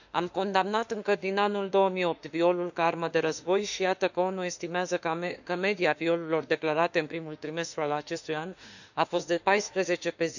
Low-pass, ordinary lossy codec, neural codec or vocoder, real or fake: 7.2 kHz; none; autoencoder, 48 kHz, 32 numbers a frame, DAC-VAE, trained on Japanese speech; fake